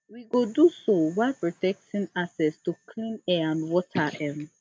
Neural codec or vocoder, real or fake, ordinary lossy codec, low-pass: none; real; none; none